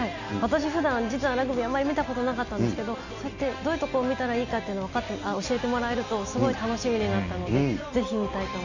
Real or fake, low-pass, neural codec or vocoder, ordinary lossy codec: real; 7.2 kHz; none; none